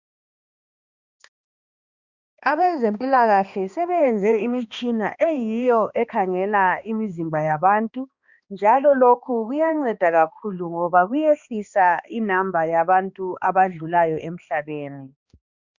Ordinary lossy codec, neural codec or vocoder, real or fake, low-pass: Opus, 64 kbps; codec, 16 kHz, 2 kbps, X-Codec, HuBERT features, trained on balanced general audio; fake; 7.2 kHz